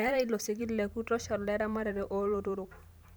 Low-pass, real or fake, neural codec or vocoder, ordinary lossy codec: none; fake; vocoder, 44.1 kHz, 128 mel bands every 512 samples, BigVGAN v2; none